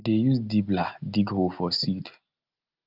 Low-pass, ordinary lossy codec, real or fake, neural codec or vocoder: 5.4 kHz; Opus, 24 kbps; real; none